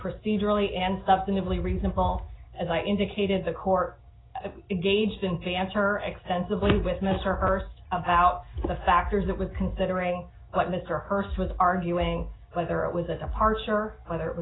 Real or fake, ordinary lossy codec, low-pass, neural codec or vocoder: real; AAC, 16 kbps; 7.2 kHz; none